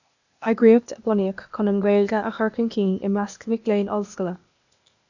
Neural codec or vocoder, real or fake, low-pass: codec, 16 kHz, 0.8 kbps, ZipCodec; fake; 7.2 kHz